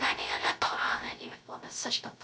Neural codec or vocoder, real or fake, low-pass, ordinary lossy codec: codec, 16 kHz, 0.3 kbps, FocalCodec; fake; none; none